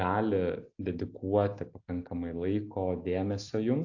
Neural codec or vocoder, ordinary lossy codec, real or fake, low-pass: none; AAC, 48 kbps; real; 7.2 kHz